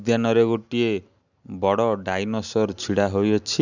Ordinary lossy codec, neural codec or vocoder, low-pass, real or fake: none; none; 7.2 kHz; real